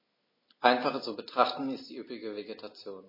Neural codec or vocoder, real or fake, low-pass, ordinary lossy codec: none; real; 5.4 kHz; MP3, 24 kbps